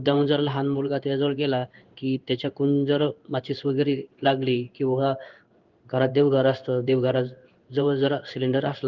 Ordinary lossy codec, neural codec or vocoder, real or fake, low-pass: Opus, 24 kbps; codec, 16 kHz in and 24 kHz out, 1 kbps, XY-Tokenizer; fake; 7.2 kHz